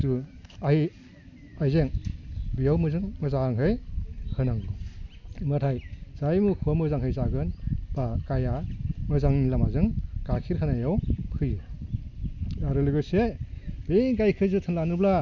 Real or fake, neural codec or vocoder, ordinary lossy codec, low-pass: real; none; none; 7.2 kHz